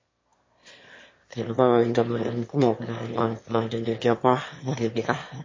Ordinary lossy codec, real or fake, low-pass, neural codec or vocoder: MP3, 32 kbps; fake; 7.2 kHz; autoencoder, 22.05 kHz, a latent of 192 numbers a frame, VITS, trained on one speaker